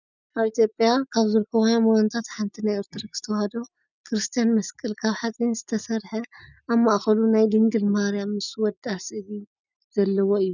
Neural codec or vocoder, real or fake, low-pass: none; real; 7.2 kHz